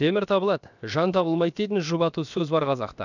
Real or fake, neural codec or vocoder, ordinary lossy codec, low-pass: fake; codec, 16 kHz, 0.7 kbps, FocalCodec; none; 7.2 kHz